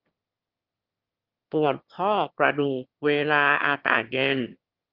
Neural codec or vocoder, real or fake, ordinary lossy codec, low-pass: autoencoder, 22.05 kHz, a latent of 192 numbers a frame, VITS, trained on one speaker; fake; Opus, 32 kbps; 5.4 kHz